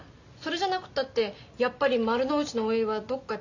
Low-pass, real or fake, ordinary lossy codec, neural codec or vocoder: 7.2 kHz; real; none; none